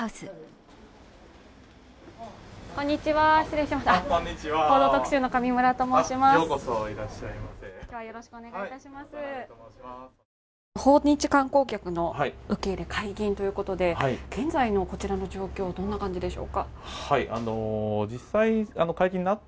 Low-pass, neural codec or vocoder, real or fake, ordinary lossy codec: none; none; real; none